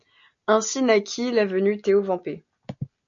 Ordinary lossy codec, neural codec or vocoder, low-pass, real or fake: AAC, 64 kbps; none; 7.2 kHz; real